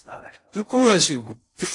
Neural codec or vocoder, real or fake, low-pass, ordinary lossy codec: codec, 16 kHz in and 24 kHz out, 0.6 kbps, FocalCodec, streaming, 4096 codes; fake; 10.8 kHz; AAC, 48 kbps